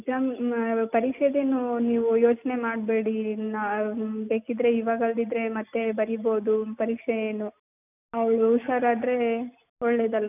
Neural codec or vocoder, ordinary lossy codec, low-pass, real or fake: none; none; 3.6 kHz; real